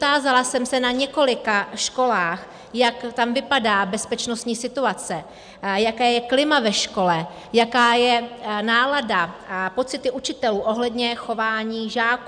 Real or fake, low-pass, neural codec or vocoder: real; 9.9 kHz; none